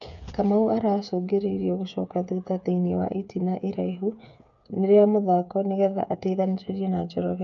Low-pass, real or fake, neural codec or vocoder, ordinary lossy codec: 7.2 kHz; fake; codec, 16 kHz, 8 kbps, FreqCodec, smaller model; none